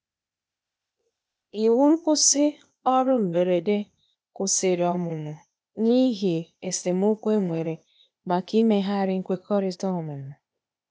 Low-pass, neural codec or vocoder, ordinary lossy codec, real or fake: none; codec, 16 kHz, 0.8 kbps, ZipCodec; none; fake